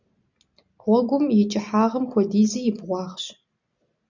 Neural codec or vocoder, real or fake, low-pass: none; real; 7.2 kHz